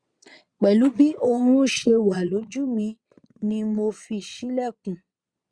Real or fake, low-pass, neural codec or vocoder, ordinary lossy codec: fake; 9.9 kHz; vocoder, 22.05 kHz, 80 mel bands, Vocos; Opus, 64 kbps